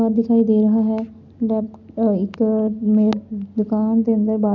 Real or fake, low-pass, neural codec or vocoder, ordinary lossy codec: real; 7.2 kHz; none; none